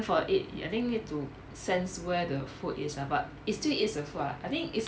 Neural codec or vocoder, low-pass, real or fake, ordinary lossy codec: none; none; real; none